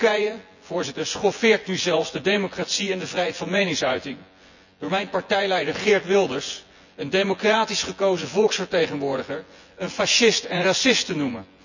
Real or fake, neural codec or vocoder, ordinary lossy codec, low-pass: fake; vocoder, 24 kHz, 100 mel bands, Vocos; none; 7.2 kHz